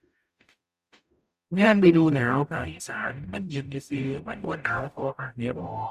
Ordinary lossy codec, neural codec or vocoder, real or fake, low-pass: Opus, 64 kbps; codec, 44.1 kHz, 0.9 kbps, DAC; fake; 14.4 kHz